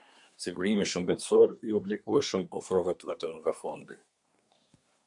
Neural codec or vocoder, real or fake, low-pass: codec, 24 kHz, 1 kbps, SNAC; fake; 10.8 kHz